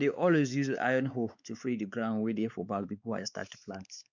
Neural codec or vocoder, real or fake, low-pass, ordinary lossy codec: codec, 16 kHz, 4 kbps, X-Codec, WavLM features, trained on Multilingual LibriSpeech; fake; 7.2 kHz; none